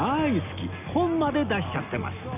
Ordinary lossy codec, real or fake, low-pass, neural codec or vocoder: none; real; 3.6 kHz; none